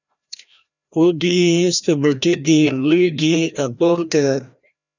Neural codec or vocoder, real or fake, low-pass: codec, 16 kHz, 1 kbps, FreqCodec, larger model; fake; 7.2 kHz